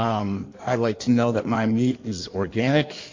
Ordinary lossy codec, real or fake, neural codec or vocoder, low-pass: MP3, 48 kbps; fake; codec, 16 kHz in and 24 kHz out, 1.1 kbps, FireRedTTS-2 codec; 7.2 kHz